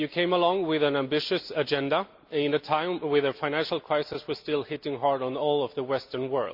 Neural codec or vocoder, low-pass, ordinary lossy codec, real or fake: none; 5.4 kHz; MP3, 48 kbps; real